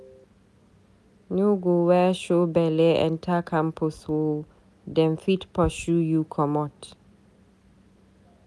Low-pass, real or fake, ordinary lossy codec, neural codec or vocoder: none; real; none; none